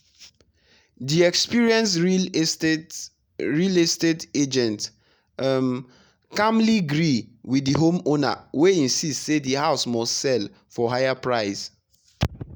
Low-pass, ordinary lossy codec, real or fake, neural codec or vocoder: none; none; real; none